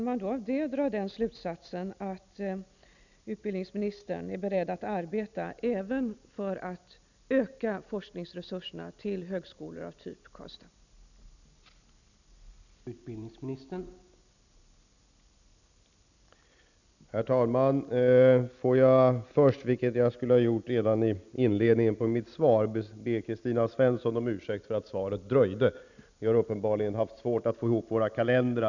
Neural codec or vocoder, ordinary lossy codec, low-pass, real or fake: none; none; 7.2 kHz; real